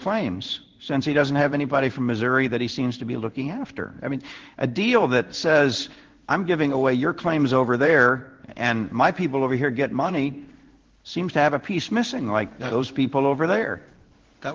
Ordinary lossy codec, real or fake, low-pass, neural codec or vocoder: Opus, 16 kbps; fake; 7.2 kHz; codec, 16 kHz in and 24 kHz out, 1 kbps, XY-Tokenizer